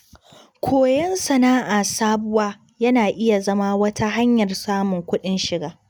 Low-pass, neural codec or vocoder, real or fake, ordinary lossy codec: none; none; real; none